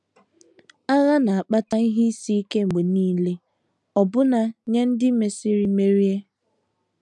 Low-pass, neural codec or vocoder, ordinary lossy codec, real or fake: 10.8 kHz; none; none; real